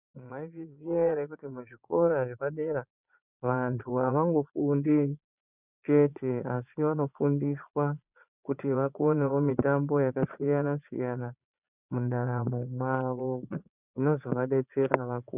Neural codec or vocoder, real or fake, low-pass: vocoder, 22.05 kHz, 80 mel bands, Vocos; fake; 3.6 kHz